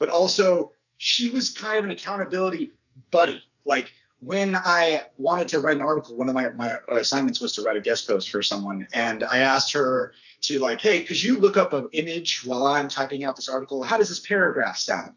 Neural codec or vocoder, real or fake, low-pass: codec, 44.1 kHz, 2.6 kbps, SNAC; fake; 7.2 kHz